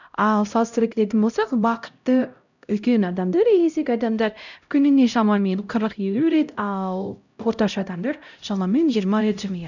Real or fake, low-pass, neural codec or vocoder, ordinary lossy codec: fake; 7.2 kHz; codec, 16 kHz, 0.5 kbps, X-Codec, HuBERT features, trained on LibriSpeech; none